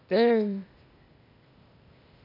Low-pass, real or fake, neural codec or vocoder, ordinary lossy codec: 5.4 kHz; fake; codec, 16 kHz, 0.8 kbps, ZipCodec; MP3, 48 kbps